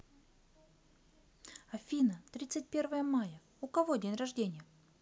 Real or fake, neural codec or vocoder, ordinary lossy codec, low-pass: real; none; none; none